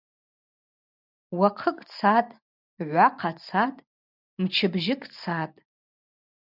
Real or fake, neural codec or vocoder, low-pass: real; none; 5.4 kHz